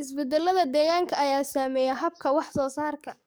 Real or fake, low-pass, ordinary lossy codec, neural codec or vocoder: fake; none; none; codec, 44.1 kHz, 7.8 kbps, DAC